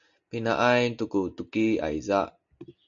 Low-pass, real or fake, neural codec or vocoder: 7.2 kHz; real; none